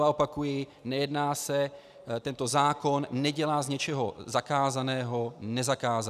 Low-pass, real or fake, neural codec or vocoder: 14.4 kHz; real; none